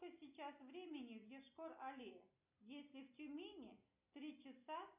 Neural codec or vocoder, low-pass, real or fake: none; 3.6 kHz; real